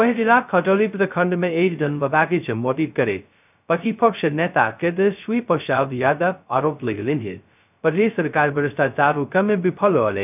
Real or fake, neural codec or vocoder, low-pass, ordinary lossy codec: fake; codec, 16 kHz, 0.2 kbps, FocalCodec; 3.6 kHz; none